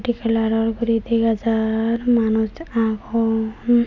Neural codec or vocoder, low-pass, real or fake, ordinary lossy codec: none; 7.2 kHz; real; none